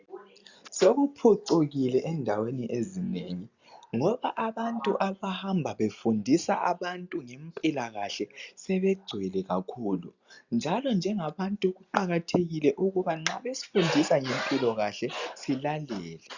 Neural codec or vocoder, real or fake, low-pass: none; real; 7.2 kHz